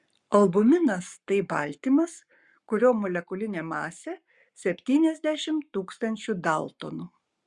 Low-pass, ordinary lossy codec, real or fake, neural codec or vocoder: 10.8 kHz; Opus, 64 kbps; fake; codec, 44.1 kHz, 7.8 kbps, Pupu-Codec